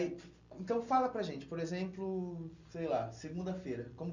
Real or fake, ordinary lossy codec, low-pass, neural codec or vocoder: real; none; 7.2 kHz; none